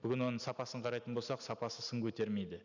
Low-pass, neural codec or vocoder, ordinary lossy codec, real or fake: 7.2 kHz; none; none; real